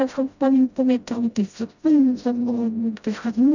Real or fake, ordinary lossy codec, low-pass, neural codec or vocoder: fake; none; 7.2 kHz; codec, 16 kHz, 0.5 kbps, FreqCodec, smaller model